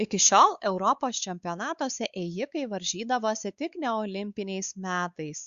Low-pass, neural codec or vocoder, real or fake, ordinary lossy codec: 7.2 kHz; codec, 16 kHz, 4 kbps, X-Codec, WavLM features, trained on Multilingual LibriSpeech; fake; Opus, 64 kbps